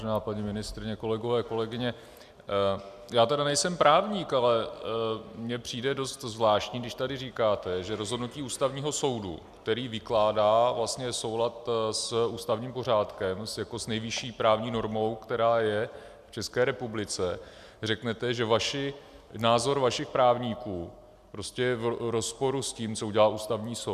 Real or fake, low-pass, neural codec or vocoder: real; 14.4 kHz; none